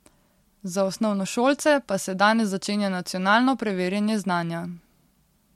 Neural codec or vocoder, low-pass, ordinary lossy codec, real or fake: none; 19.8 kHz; MP3, 64 kbps; real